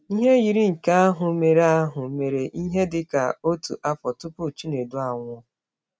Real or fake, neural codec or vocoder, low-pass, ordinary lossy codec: real; none; none; none